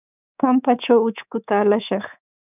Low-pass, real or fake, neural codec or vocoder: 3.6 kHz; fake; codec, 24 kHz, 3.1 kbps, DualCodec